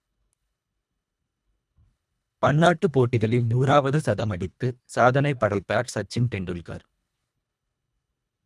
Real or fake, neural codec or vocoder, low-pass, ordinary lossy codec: fake; codec, 24 kHz, 1.5 kbps, HILCodec; none; none